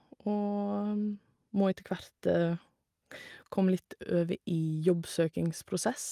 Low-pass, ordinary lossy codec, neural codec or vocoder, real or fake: 14.4 kHz; Opus, 32 kbps; none; real